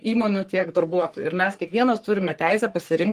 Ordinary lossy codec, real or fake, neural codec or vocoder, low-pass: Opus, 24 kbps; fake; codec, 44.1 kHz, 3.4 kbps, Pupu-Codec; 14.4 kHz